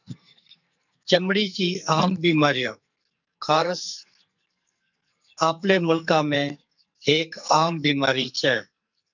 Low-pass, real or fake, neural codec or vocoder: 7.2 kHz; fake; codec, 44.1 kHz, 2.6 kbps, SNAC